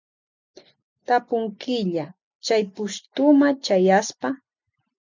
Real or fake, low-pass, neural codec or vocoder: real; 7.2 kHz; none